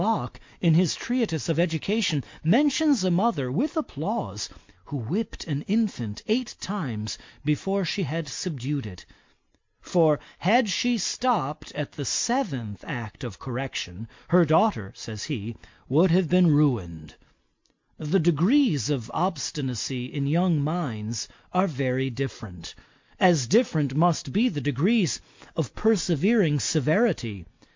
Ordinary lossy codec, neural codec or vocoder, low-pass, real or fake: MP3, 48 kbps; none; 7.2 kHz; real